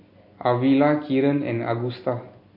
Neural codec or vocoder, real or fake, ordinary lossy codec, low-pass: none; real; MP3, 32 kbps; 5.4 kHz